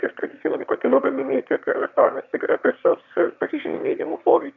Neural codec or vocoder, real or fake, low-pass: autoencoder, 22.05 kHz, a latent of 192 numbers a frame, VITS, trained on one speaker; fake; 7.2 kHz